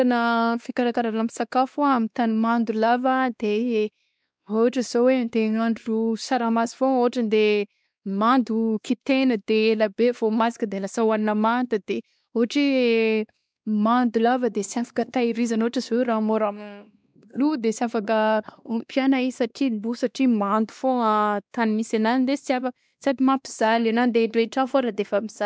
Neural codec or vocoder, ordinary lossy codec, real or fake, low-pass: codec, 16 kHz, 2 kbps, X-Codec, WavLM features, trained on Multilingual LibriSpeech; none; fake; none